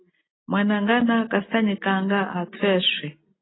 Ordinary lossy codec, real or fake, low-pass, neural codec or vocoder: AAC, 16 kbps; real; 7.2 kHz; none